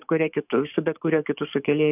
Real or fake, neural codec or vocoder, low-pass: fake; codec, 44.1 kHz, 7.8 kbps, DAC; 3.6 kHz